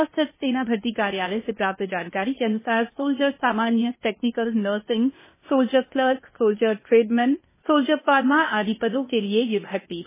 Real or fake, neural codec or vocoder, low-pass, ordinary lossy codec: fake; codec, 16 kHz, 0.8 kbps, ZipCodec; 3.6 kHz; MP3, 16 kbps